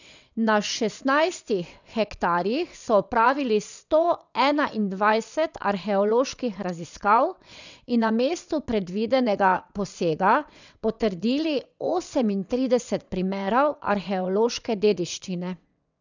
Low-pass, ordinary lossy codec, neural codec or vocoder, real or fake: 7.2 kHz; none; vocoder, 22.05 kHz, 80 mel bands, WaveNeXt; fake